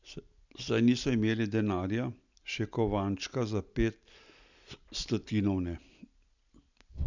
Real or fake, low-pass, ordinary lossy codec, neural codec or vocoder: real; 7.2 kHz; none; none